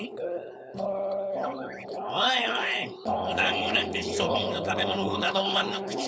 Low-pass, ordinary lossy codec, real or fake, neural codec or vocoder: none; none; fake; codec, 16 kHz, 4.8 kbps, FACodec